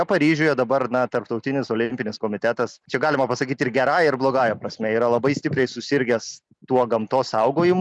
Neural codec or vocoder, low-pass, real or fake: none; 10.8 kHz; real